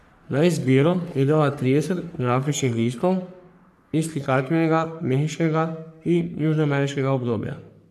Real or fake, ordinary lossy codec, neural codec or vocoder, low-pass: fake; none; codec, 44.1 kHz, 3.4 kbps, Pupu-Codec; 14.4 kHz